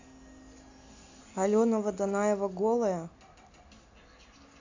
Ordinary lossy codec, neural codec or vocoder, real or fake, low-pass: AAC, 48 kbps; none; real; 7.2 kHz